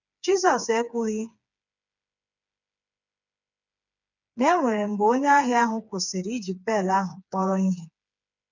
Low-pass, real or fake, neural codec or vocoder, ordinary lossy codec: 7.2 kHz; fake; codec, 16 kHz, 4 kbps, FreqCodec, smaller model; none